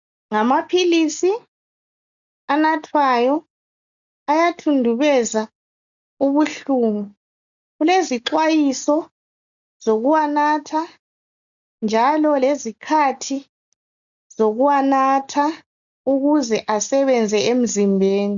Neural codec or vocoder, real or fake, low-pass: none; real; 7.2 kHz